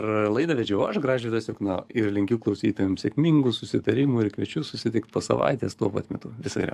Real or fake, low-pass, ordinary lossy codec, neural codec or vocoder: fake; 14.4 kHz; AAC, 96 kbps; codec, 44.1 kHz, 7.8 kbps, DAC